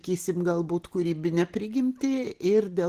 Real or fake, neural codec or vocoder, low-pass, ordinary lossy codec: real; none; 14.4 kHz; Opus, 16 kbps